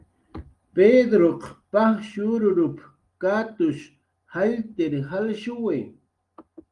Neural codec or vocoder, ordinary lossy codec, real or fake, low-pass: none; Opus, 24 kbps; real; 10.8 kHz